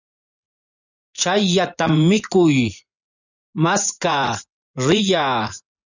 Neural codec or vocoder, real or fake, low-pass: vocoder, 24 kHz, 100 mel bands, Vocos; fake; 7.2 kHz